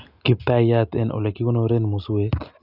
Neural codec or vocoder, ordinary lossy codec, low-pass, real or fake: none; none; 5.4 kHz; real